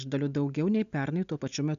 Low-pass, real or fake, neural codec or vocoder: 7.2 kHz; real; none